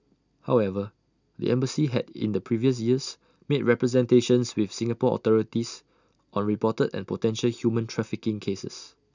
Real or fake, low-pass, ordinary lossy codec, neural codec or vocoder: real; 7.2 kHz; none; none